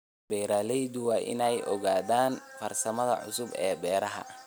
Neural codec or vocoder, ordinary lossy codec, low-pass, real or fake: none; none; none; real